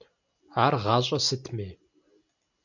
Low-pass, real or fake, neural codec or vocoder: 7.2 kHz; real; none